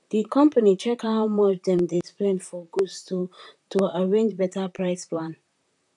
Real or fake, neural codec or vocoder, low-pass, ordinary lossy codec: fake; vocoder, 44.1 kHz, 128 mel bands, Pupu-Vocoder; 10.8 kHz; none